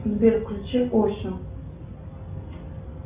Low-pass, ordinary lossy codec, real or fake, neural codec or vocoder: 3.6 kHz; Opus, 64 kbps; real; none